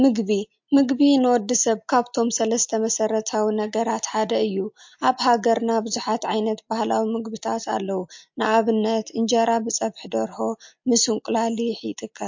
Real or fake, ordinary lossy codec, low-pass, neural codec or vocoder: real; MP3, 48 kbps; 7.2 kHz; none